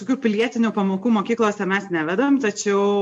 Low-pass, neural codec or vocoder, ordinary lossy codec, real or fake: 10.8 kHz; none; MP3, 64 kbps; real